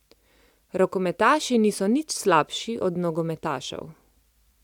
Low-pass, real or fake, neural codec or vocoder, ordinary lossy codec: 19.8 kHz; real; none; none